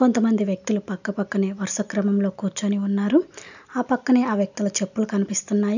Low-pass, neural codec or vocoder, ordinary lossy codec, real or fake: 7.2 kHz; none; none; real